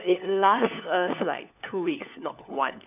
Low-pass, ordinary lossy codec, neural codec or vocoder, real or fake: 3.6 kHz; none; codec, 16 kHz, 4 kbps, FunCodec, trained on LibriTTS, 50 frames a second; fake